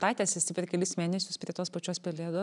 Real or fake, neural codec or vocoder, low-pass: real; none; 10.8 kHz